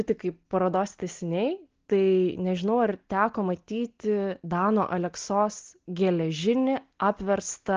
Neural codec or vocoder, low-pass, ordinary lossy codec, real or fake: none; 7.2 kHz; Opus, 16 kbps; real